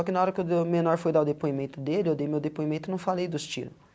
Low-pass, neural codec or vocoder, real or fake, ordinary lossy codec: none; none; real; none